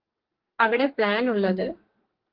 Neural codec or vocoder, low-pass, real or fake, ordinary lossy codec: codec, 44.1 kHz, 2.6 kbps, SNAC; 5.4 kHz; fake; Opus, 16 kbps